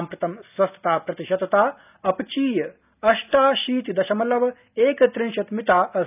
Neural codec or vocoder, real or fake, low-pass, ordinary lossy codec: none; real; 3.6 kHz; none